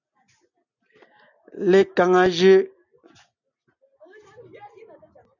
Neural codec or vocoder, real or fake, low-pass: none; real; 7.2 kHz